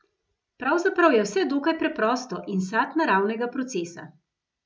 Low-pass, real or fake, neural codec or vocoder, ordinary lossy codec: none; real; none; none